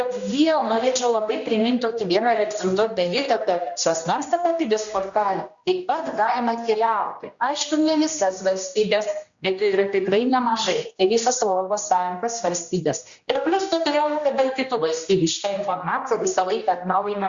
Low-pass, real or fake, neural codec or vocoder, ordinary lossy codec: 7.2 kHz; fake; codec, 16 kHz, 1 kbps, X-Codec, HuBERT features, trained on general audio; Opus, 64 kbps